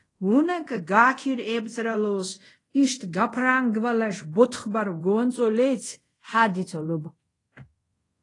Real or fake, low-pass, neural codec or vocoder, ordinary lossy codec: fake; 10.8 kHz; codec, 24 kHz, 0.5 kbps, DualCodec; AAC, 32 kbps